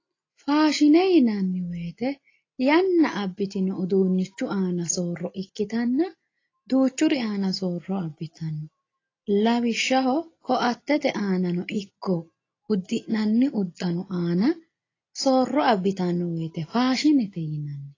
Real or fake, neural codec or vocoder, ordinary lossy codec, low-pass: real; none; AAC, 32 kbps; 7.2 kHz